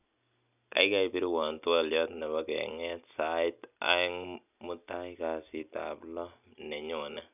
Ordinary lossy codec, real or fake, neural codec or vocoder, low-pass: none; real; none; 3.6 kHz